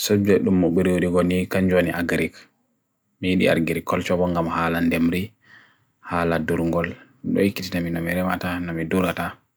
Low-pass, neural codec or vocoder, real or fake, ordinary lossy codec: none; none; real; none